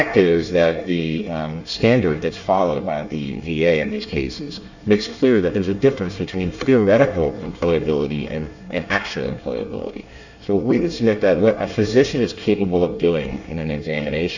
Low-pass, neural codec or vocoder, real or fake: 7.2 kHz; codec, 24 kHz, 1 kbps, SNAC; fake